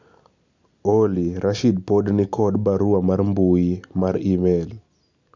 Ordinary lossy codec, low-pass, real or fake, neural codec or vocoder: MP3, 64 kbps; 7.2 kHz; real; none